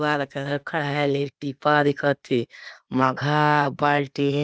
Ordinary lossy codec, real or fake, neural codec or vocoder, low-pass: none; fake; codec, 16 kHz, 0.8 kbps, ZipCodec; none